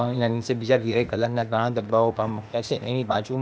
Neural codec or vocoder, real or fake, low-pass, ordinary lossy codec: codec, 16 kHz, 0.8 kbps, ZipCodec; fake; none; none